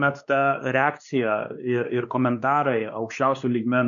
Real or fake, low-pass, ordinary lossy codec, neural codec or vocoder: fake; 7.2 kHz; MP3, 96 kbps; codec, 16 kHz, 2 kbps, X-Codec, WavLM features, trained on Multilingual LibriSpeech